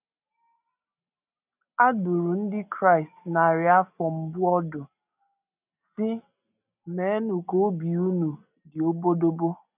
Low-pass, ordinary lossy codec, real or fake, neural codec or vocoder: 3.6 kHz; none; real; none